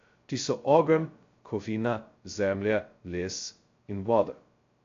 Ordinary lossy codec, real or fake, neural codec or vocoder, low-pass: AAC, 48 kbps; fake; codec, 16 kHz, 0.2 kbps, FocalCodec; 7.2 kHz